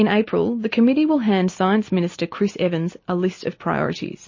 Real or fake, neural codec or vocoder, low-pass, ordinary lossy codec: real; none; 7.2 kHz; MP3, 32 kbps